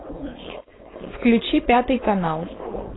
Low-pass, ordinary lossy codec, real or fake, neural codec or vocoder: 7.2 kHz; AAC, 16 kbps; fake; codec, 16 kHz, 4.8 kbps, FACodec